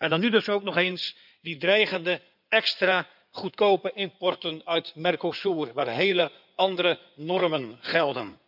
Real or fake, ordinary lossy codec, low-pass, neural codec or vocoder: fake; none; 5.4 kHz; codec, 16 kHz in and 24 kHz out, 2.2 kbps, FireRedTTS-2 codec